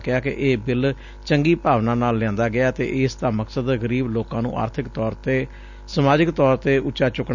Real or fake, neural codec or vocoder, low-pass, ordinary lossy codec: real; none; 7.2 kHz; none